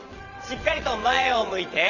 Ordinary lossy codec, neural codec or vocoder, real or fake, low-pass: none; vocoder, 22.05 kHz, 80 mel bands, WaveNeXt; fake; 7.2 kHz